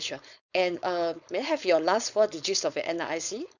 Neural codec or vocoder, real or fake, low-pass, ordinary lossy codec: codec, 16 kHz, 4.8 kbps, FACodec; fake; 7.2 kHz; none